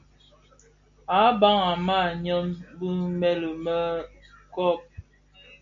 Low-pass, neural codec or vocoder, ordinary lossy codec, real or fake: 7.2 kHz; none; AAC, 48 kbps; real